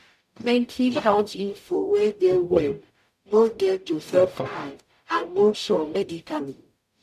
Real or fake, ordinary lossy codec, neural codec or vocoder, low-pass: fake; none; codec, 44.1 kHz, 0.9 kbps, DAC; 14.4 kHz